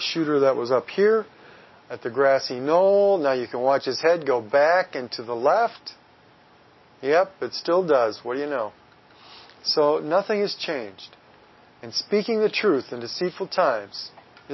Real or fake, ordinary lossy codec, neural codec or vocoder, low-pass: real; MP3, 24 kbps; none; 7.2 kHz